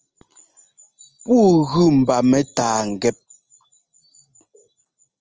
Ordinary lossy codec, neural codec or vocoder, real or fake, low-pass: Opus, 24 kbps; none; real; 7.2 kHz